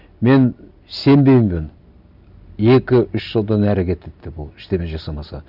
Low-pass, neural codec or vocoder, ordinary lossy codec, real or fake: 5.4 kHz; none; none; real